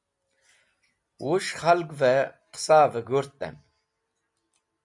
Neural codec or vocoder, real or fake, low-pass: none; real; 10.8 kHz